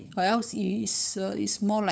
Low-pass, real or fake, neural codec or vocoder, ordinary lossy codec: none; fake; codec, 16 kHz, 8 kbps, FunCodec, trained on LibriTTS, 25 frames a second; none